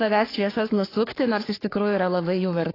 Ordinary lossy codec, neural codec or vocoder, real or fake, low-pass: AAC, 24 kbps; codec, 44.1 kHz, 3.4 kbps, Pupu-Codec; fake; 5.4 kHz